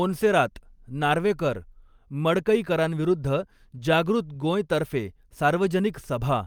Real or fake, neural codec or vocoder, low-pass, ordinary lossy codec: real; none; 19.8 kHz; Opus, 32 kbps